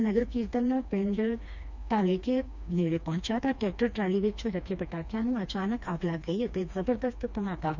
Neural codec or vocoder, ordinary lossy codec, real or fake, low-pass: codec, 16 kHz, 2 kbps, FreqCodec, smaller model; none; fake; 7.2 kHz